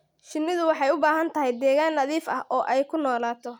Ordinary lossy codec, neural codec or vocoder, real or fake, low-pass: none; none; real; 19.8 kHz